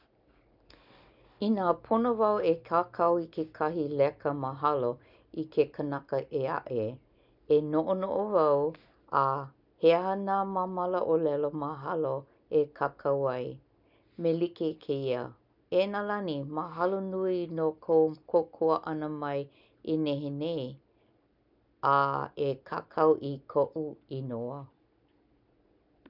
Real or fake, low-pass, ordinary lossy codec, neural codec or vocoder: real; 5.4 kHz; none; none